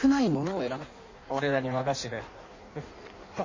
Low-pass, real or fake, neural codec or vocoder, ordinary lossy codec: 7.2 kHz; fake; codec, 16 kHz in and 24 kHz out, 1.1 kbps, FireRedTTS-2 codec; MP3, 32 kbps